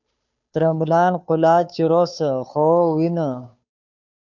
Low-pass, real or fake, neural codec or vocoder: 7.2 kHz; fake; codec, 16 kHz, 2 kbps, FunCodec, trained on Chinese and English, 25 frames a second